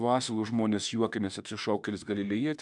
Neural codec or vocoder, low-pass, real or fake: autoencoder, 48 kHz, 32 numbers a frame, DAC-VAE, trained on Japanese speech; 10.8 kHz; fake